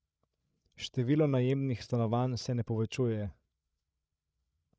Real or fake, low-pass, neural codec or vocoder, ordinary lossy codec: fake; none; codec, 16 kHz, 16 kbps, FreqCodec, larger model; none